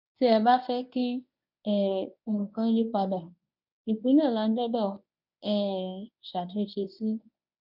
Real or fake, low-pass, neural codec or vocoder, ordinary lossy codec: fake; 5.4 kHz; codec, 24 kHz, 0.9 kbps, WavTokenizer, medium speech release version 1; none